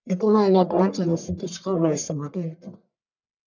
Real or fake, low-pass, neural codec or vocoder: fake; 7.2 kHz; codec, 44.1 kHz, 1.7 kbps, Pupu-Codec